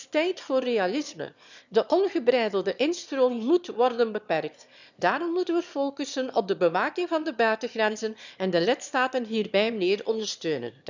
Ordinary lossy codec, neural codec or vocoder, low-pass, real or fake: none; autoencoder, 22.05 kHz, a latent of 192 numbers a frame, VITS, trained on one speaker; 7.2 kHz; fake